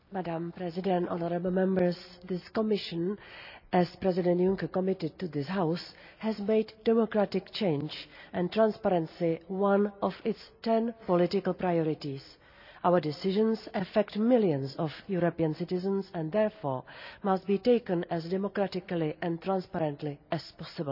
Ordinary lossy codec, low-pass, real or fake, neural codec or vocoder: none; 5.4 kHz; real; none